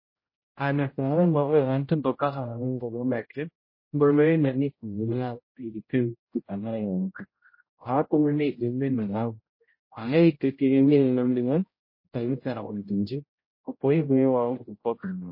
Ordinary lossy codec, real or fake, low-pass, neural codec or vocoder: MP3, 24 kbps; fake; 5.4 kHz; codec, 16 kHz, 0.5 kbps, X-Codec, HuBERT features, trained on general audio